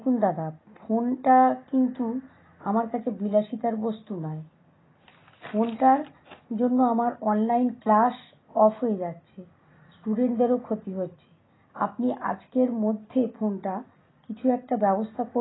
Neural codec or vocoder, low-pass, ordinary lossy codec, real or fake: none; 7.2 kHz; AAC, 16 kbps; real